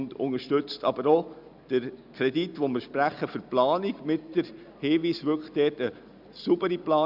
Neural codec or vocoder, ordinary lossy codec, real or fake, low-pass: none; Opus, 64 kbps; real; 5.4 kHz